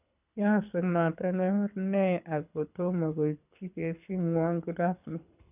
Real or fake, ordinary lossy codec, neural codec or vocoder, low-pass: fake; none; codec, 24 kHz, 6 kbps, HILCodec; 3.6 kHz